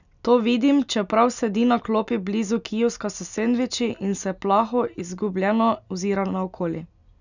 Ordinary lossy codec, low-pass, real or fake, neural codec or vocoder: none; 7.2 kHz; real; none